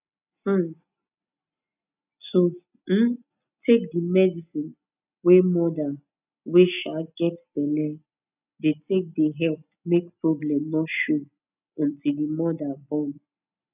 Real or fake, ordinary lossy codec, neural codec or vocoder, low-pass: real; none; none; 3.6 kHz